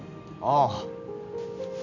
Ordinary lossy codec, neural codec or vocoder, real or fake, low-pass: none; none; real; 7.2 kHz